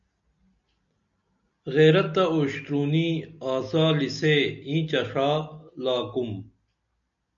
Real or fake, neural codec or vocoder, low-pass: real; none; 7.2 kHz